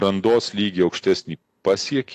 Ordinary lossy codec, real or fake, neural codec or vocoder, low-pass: AAC, 48 kbps; real; none; 14.4 kHz